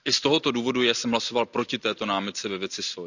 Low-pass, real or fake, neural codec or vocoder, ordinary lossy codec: 7.2 kHz; real; none; none